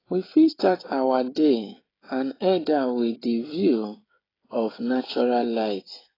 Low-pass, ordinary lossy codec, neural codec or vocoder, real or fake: 5.4 kHz; AAC, 24 kbps; codec, 16 kHz, 16 kbps, FreqCodec, smaller model; fake